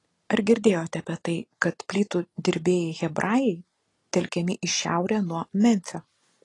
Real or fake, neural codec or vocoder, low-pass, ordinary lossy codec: real; none; 10.8 kHz; AAC, 32 kbps